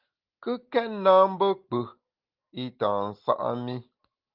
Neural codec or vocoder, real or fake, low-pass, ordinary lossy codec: none; real; 5.4 kHz; Opus, 32 kbps